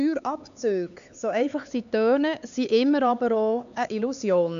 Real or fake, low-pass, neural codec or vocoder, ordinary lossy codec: fake; 7.2 kHz; codec, 16 kHz, 4 kbps, X-Codec, HuBERT features, trained on LibriSpeech; none